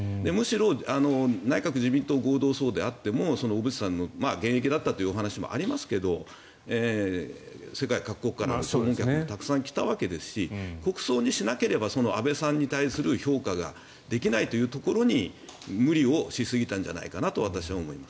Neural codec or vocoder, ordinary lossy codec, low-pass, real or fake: none; none; none; real